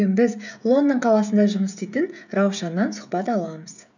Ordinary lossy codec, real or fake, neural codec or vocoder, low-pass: none; fake; codec, 16 kHz, 16 kbps, FreqCodec, smaller model; 7.2 kHz